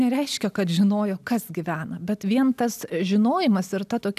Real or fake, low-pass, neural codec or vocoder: real; 14.4 kHz; none